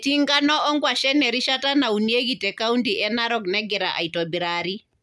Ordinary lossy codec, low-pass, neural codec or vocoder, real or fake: none; none; none; real